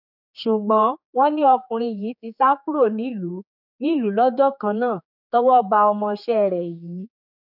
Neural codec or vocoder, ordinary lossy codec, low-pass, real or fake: codec, 16 kHz, 4 kbps, X-Codec, HuBERT features, trained on general audio; none; 5.4 kHz; fake